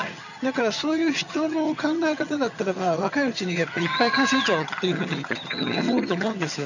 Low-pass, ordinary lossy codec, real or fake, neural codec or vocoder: 7.2 kHz; none; fake; vocoder, 22.05 kHz, 80 mel bands, HiFi-GAN